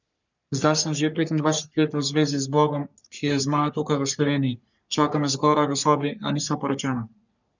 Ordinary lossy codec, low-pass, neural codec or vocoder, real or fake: none; 7.2 kHz; codec, 44.1 kHz, 3.4 kbps, Pupu-Codec; fake